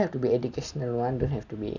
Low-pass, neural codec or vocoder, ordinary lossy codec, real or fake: 7.2 kHz; none; none; real